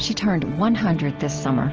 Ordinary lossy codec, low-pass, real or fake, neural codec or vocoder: Opus, 16 kbps; 7.2 kHz; real; none